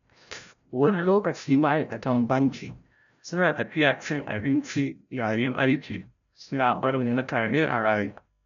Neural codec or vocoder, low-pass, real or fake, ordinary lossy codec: codec, 16 kHz, 0.5 kbps, FreqCodec, larger model; 7.2 kHz; fake; none